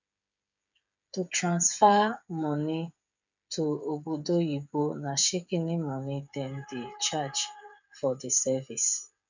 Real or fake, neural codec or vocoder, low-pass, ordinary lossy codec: fake; codec, 16 kHz, 8 kbps, FreqCodec, smaller model; 7.2 kHz; none